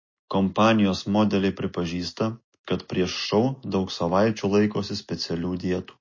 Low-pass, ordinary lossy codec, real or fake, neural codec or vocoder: 7.2 kHz; MP3, 32 kbps; real; none